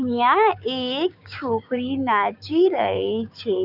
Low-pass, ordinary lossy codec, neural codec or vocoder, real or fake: 5.4 kHz; none; codec, 16 kHz, 6 kbps, DAC; fake